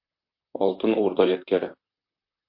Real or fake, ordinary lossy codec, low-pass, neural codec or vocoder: fake; MP3, 32 kbps; 5.4 kHz; vocoder, 44.1 kHz, 128 mel bands, Pupu-Vocoder